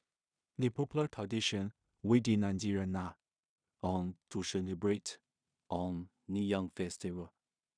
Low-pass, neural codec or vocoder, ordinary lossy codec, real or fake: 9.9 kHz; codec, 16 kHz in and 24 kHz out, 0.4 kbps, LongCat-Audio-Codec, two codebook decoder; none; fake